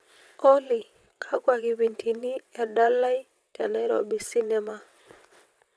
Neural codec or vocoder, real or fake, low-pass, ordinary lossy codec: vocoder, 22.05 kHz, 80 mel bands, WaveNeXt; fake; none; none